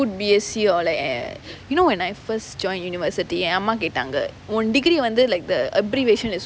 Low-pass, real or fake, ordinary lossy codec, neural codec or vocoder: none; real; none; none